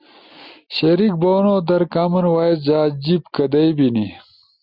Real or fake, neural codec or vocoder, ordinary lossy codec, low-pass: real; none; Opus, 64 kbps; 5.4 kHz